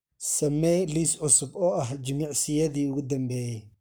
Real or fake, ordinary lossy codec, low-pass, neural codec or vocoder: fake; none; none; codec, 44.1 kHz, 7.8 kbps, Pupu-Codec